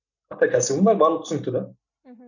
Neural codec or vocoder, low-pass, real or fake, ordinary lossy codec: none; 7.2 kHz; real; none